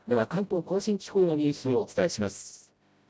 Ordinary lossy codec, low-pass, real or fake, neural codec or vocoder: none; none; fake; codec, 16 kHz, 0.5 kbps, FreqCodec, smaller model